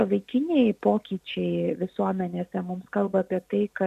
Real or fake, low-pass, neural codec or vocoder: real; 14.4 kHz; none